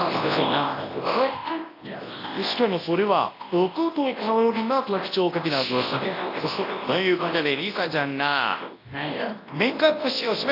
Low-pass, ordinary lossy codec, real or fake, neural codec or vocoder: 5.4 kHz; AAC, 32 kbps; fake; codec, 24 kHz, 0.9 kbps, WavTokenizer, large speech release